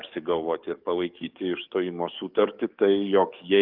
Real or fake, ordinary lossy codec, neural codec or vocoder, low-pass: fake; Opus, 24 kbps; codec, 44.1 kHz, 7.8 kbps, DAC; 5.4 kHz